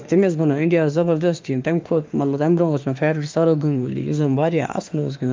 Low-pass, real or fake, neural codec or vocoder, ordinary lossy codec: 7.2 kHz; fake; autoencoder, 48 kHz, 32 numbers a frame, DAC-VAE, trained on Japanese speech; Opus, 24 kbps